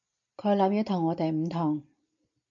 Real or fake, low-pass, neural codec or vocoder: real; 7.2 kHz; none